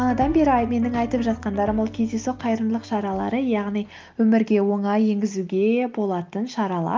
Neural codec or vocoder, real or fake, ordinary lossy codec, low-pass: none; real; Opus, 32 kbps; 7.2 kHz